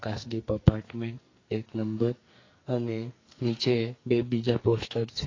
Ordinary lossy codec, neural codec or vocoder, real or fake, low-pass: AAC, 32 kbps; codec, 44.1 kHz, 2.6 kbps, SNAC; fake; 7.2 kHz